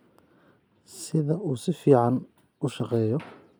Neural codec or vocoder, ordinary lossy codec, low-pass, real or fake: none; none; none; real